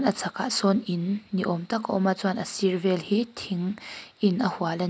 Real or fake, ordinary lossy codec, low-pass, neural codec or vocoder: real; none; none; none